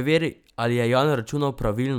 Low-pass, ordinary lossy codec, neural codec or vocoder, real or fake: 19.8 kHz; none; none; real